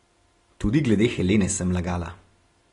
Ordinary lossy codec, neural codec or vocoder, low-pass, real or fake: AAC, 32 kbps; none; 10.8 kHz; real